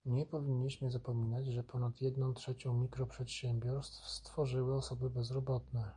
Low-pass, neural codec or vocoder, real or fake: 9.9 kHz; none; real